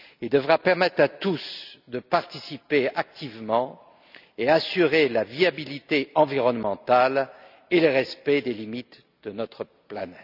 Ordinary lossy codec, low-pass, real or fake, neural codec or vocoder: none; 5.4 kHz; real; none